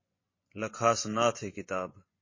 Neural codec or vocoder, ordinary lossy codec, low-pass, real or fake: vocoder, 24 kHz, 100 mel bands, Vocos; MP3, 32 kbps; 7.2 kHz; fake